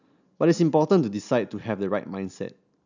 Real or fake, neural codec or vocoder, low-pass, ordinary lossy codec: real; none; 7.2 kHz; none